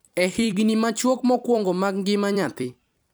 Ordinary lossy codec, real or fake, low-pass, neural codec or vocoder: none; fake; none; vocoder, 44.1 kHz, 128 mel bands every 256 samples, BigVGAN v2